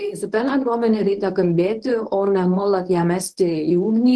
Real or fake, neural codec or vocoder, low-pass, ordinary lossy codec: fake; codec, 24 kHz, 0.9 kbps, WavTokenizer, medium speech release version 1; 10.8 kHz; Opus, 16 kbps